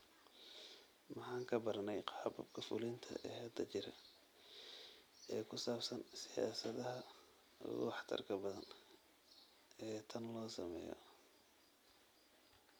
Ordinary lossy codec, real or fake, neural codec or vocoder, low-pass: none; real; none; none